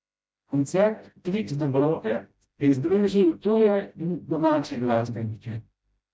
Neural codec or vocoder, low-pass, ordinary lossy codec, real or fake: codec, 16 kHz, 0.5 kbps, FreqCodec, smaller model; none; none; fake